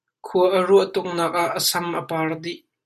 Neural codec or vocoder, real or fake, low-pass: none; real; 14.4 kHz